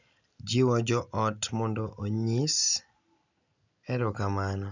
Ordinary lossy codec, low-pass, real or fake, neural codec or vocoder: none; 7.2 kHz; real; none